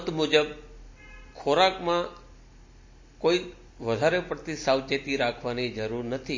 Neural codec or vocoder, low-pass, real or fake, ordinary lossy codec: none; 7.2 kHz; real; MP3, 32 kbps